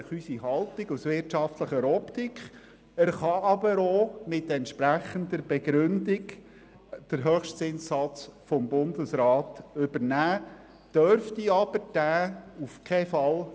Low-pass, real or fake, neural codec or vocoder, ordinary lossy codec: none; real; none; none